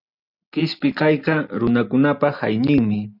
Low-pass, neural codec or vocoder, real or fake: 5.4 kHz; none; real